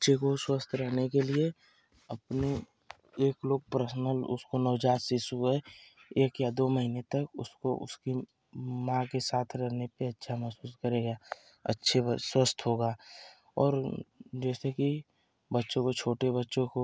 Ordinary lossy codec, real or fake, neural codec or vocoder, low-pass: none; real; none; none